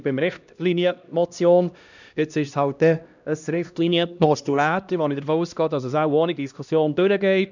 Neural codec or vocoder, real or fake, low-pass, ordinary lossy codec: codec, 16 kHz, 1 kbps, X-Codec, HuBERT features, trained on LibriSpeech; fake; 7.2 kHz; none